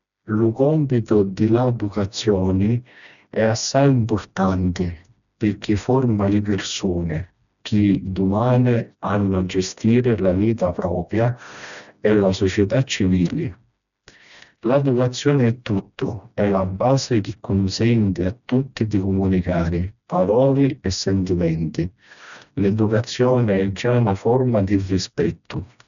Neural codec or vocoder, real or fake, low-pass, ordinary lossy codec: codec, 16 kHz, 1 kbps, FreqCodec, smaller model; fake; 7.2 kHz; none